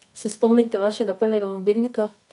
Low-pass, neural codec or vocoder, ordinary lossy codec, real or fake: 10.8 kHz; codec, 24 kHz, 0.9 kbps, WavTokenizer, medium music audio release; none; fake